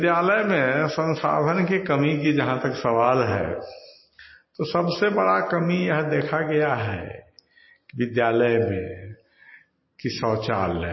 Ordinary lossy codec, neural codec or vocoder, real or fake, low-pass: MP3, 24 kbps; none; real; 7.2 kHz